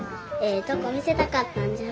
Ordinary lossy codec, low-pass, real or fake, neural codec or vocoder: none; none; real; none